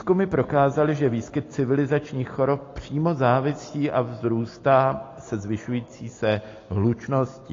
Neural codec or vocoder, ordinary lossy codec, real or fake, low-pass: none; AAC, 32 kbps; real; 7.2 kHz